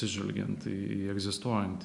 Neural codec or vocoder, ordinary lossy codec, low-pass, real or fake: none; MP3, 96 kbps; 10.8 kHz; real